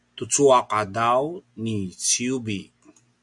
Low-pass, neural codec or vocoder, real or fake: 10.8 kHz; none; real